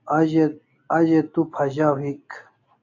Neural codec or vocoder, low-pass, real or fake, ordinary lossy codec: none; 7.2 kHz; real; AAC, 48 kbps